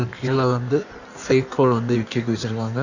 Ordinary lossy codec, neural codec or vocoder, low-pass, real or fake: none; codec, 16 kHz in and 24 kHz out, 1.1 kbps, FireRedTTS-2 codec; 7.2 kHz; fake